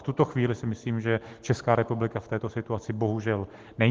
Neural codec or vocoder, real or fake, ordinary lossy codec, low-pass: none; real; Opus, 16 kbps; 7.2 kHz